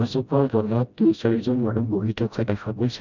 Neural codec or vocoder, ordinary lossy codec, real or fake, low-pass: codec, 16 kHz, 0.5 kbps, FreqCodec, smaller model; none; fake; 7.2 kHz